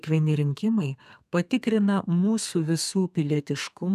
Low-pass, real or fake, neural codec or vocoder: 14.4 kHz; fake; codec, 32 kHz, 1.9 kbps, SNAC